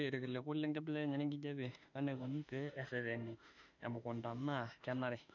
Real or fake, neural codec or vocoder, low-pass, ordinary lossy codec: fake; autoencoder, 48 kHz, 32 numbers a frame, DAC-VAE, trained on Japanese speech; 7.2 kHz; none